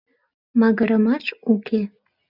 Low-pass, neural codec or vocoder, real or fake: 5.4 kHz; none; real